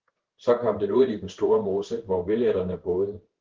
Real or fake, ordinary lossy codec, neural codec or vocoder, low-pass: fake; Opus, 16 kbps; codec, 16 kHz in and 24 kHz out, 1 kbps, XY-Tokenizer; 7.2 kHz